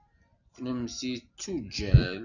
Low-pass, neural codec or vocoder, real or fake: 7.2 kHz; none; real